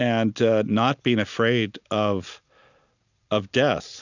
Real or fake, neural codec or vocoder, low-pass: real; none; 7.2 kHz